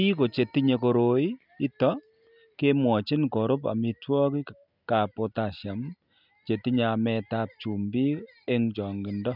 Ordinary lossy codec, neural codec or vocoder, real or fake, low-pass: none; none; real; 5.4 kHz